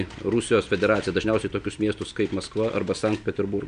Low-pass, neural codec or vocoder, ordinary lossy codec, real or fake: 9.9 kHz; none; MP3, 96 kbps; real